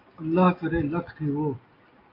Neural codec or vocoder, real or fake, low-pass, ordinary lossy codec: none; real; 5.4 kHz; AAC, 32 kbps